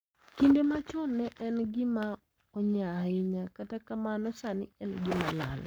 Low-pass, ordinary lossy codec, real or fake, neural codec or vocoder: none; none; fake; codec, 44.1 kHz, 7.8 kbps, Pupu-Codec